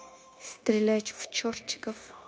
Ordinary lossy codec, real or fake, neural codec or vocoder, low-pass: none; fake; codec, 16 kHz, 0.9 kbps, LongCat-Audio-Codec; none